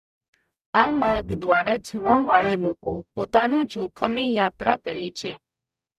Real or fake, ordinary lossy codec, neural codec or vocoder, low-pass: fake; none; codec, 44.1 kHz, 0.9 kbps, DAC; 14.4 kHz